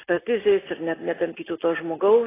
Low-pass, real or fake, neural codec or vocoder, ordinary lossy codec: 3.6 kHz; real; none; AAC, 16 kbps